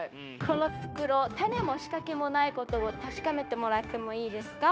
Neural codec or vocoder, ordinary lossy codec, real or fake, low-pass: codec, 16 kHz, 0.9 kbps, LongCat-Audio-Codec; none; fake; none